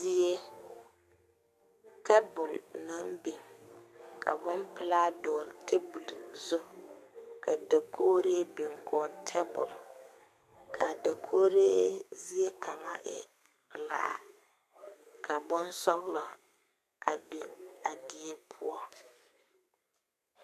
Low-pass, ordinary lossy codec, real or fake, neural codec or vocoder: 14.4 kHz; MP3, 96 kbps; fake; codec, 32 kHz, 1.9 kbps, SNAC